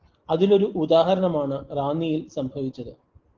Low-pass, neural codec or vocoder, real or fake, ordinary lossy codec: 7.2 kHz; none; real; Opus, 16 kbps